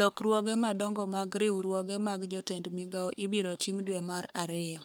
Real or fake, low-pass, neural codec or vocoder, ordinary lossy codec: fake; none; codec, 44.1 kHz, 3.4 kbps, Pupu-Codec; none